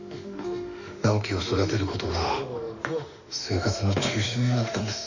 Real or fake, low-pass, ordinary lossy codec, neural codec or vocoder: fake; 7.2 kHz; none; autoencoder, 48 kHz, 32 numbers a frame, DAC-VAE, trained on Japanese speech